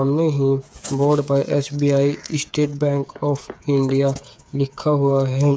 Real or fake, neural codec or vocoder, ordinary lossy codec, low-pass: fake; codec, 16 kHz, 8 kbps, FreqCodec, smaller model; none; none